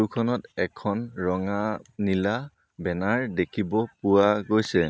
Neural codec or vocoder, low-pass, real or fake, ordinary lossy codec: none; none; real; none